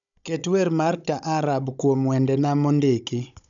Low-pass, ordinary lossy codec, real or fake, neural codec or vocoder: 7.2 kHz; none; fake; codec, 16 kHz, 16 kbps, FunCodec, trained on Chinese and English, 50 frames a second